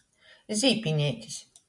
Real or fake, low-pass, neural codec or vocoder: real; 10.8 kHz; none